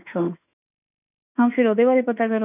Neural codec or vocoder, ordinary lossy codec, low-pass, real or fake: autoencoder, 48 kHz, 32 numbers a frame, DAC-VAE, trained on Japanese speech; none; 3.6 kHz; fake